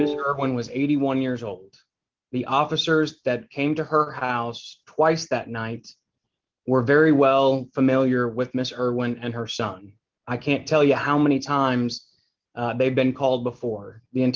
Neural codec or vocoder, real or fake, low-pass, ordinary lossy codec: none; real; 7.2 kHz; Opus, 32 kbps